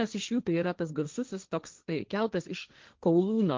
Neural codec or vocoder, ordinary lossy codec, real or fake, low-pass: codec, 16 kHz, 1.1 kbps, Voila-Tokenizer; Opus, 32 kbps; fake; 7.2 kHz